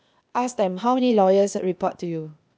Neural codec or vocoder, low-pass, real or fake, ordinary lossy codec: codec, 16 kHz, 0.8 kbps, ZipCodec; none; fake; none